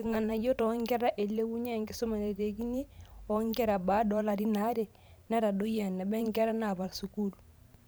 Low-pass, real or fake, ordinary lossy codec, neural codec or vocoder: none; fake; none; vocoder, 44.1 kHz, 128 mel bands every 512 samples, BigVGAN v2